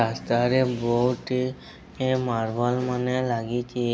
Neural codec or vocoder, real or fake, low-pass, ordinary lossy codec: none; real; none; none